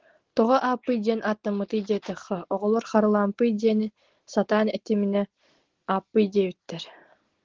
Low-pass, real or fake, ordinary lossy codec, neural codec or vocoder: 7.2 kHz; real; Opus, 16 kbps; none